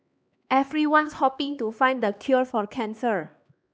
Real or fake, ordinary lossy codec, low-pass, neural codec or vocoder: fake; none; none; codec, 16 kHz, 2 kbps, X-Codec, HuBERT features, trained on LibriSpeech